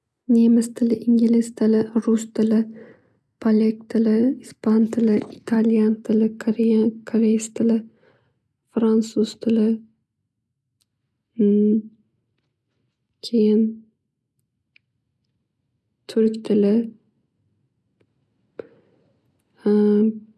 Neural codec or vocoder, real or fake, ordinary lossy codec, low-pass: none; real; none; none